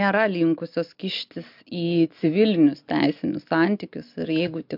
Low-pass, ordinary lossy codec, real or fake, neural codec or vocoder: 5.4 kHz; MP3, 48 kbps; real; none